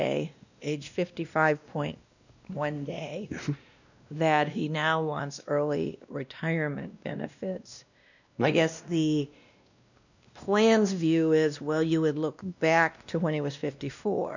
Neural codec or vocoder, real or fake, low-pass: codec, 16 kHz, 1 kbps, X-Codec, WavLM features, trained on Multilingual LibriSpeech; fake; 7.2 kHz